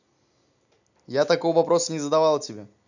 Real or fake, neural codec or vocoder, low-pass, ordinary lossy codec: real; none; 7.2 kHz; none